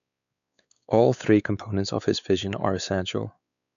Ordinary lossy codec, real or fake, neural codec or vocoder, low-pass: none; fake; codec, 16 kHz, 4 kbps, X-Codec, WavLM features, trained on Multilingual LibriSpeech; 7.2 kHz